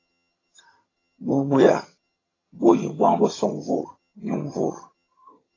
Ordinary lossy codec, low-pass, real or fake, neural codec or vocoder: AAC, 32 kbps; 7.2 kHz; fake; vocoder, 22.05 kHz, 80 mel bands, HiFi-GAN